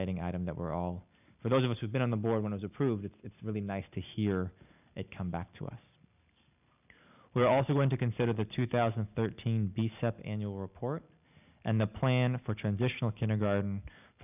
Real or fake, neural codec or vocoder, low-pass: real; none; 3.6 kHz